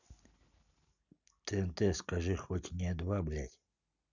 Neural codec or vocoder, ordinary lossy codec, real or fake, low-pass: none; none; real; 7.2 kHz